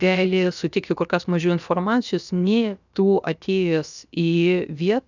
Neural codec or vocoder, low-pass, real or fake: codec, 16 kHz, about 1 kbps, DyCAST, with the encoder's durations; 7.2 kHz; fake